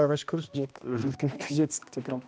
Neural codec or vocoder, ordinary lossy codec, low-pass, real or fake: codec, 16 kHz, 1 kbps, X-Codec, HuBERT features, trained on balanced general audio; none; none; fake